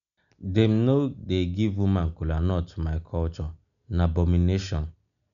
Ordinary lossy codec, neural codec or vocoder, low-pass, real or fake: none; none; 7.2 kHz; real